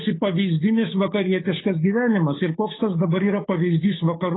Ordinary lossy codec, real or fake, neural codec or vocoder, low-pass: AAC, 16 kbps; fake; codec, 16 kHz, 8 kbps, FunCodec, trained on Chinese and English, 25 frames a second; 7.2 kHz